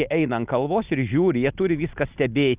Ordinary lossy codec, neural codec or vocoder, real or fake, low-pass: Opus, 24 kbps; none; real; 3.6 kHz